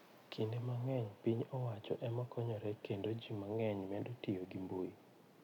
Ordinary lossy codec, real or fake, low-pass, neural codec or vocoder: none; real; 19.8 kHz; none